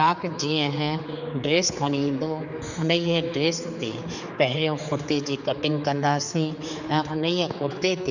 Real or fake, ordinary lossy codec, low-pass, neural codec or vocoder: fake; none; 7.2 kHz; codec, 16 kHz, 4 kbps, X-Codec, HuBERT features, trained on general audio